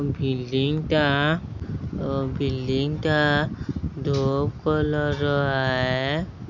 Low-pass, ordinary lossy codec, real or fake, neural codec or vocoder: 7.2 kHz; none; real; none